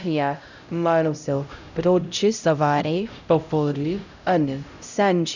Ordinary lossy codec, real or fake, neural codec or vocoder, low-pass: none; fake; codec, 16 kHz, 0.5 kbps, X-Codec, HuBERT features, trained on LibriSpeech; 7.2 kHz